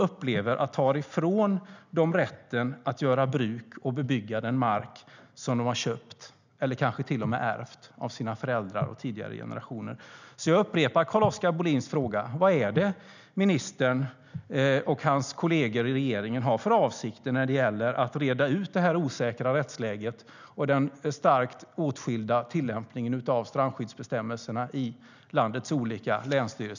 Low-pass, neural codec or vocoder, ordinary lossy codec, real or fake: 7.2 kHz; none; none; real